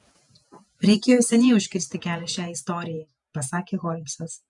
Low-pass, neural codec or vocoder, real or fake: 10.8 kHz; vocoder, 48 kHz, 128 mel bands, Vocos; fake